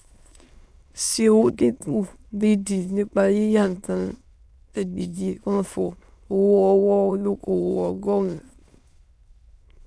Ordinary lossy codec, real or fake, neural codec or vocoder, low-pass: none; fake; autoencoder, 22.05 kHz, a latent of 192 numbers a frame, VITS, trained on many speakers; none